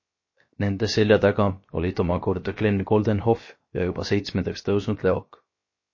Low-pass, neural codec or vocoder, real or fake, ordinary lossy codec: 7.2 kHz; codec, 16 kHz, 0.7 kbps, FocalCodec; fake; MP3, 32 kbps